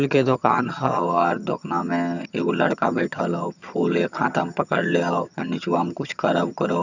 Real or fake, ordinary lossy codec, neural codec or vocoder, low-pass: fake; none; vocoder, 22.05 kHz, 80 mel bands, HiFi-GAN; 7.2 kHz